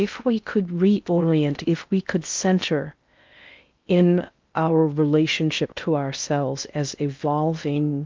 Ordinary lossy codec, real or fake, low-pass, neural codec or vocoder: Opus, 24 kbps; fake; 7.2 kHz; codec, 16 kHz in and 24 kHz out, 0.6 kbps, FocalCodec, streaming, 4096 codes